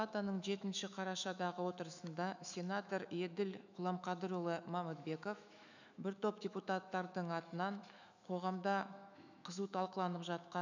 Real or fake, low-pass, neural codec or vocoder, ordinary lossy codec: fake; 7.2 kHz; autoencoder, 48 kHz, 128 numbers a frame, DAC-VAE, trained on Japanese speech; none